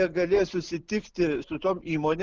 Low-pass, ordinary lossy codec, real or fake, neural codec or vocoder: 7.2 kHz; Opus, 16 kbps; real; none